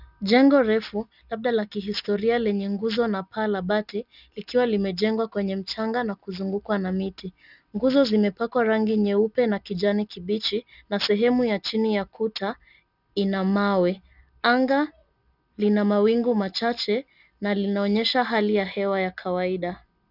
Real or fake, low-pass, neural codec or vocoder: real; 5.4 kHz; none